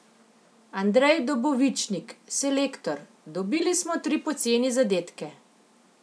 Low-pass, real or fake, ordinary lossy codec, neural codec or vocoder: none; real; none; none